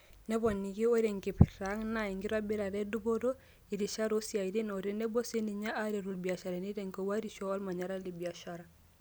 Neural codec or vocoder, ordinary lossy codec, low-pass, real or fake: none; none; none; real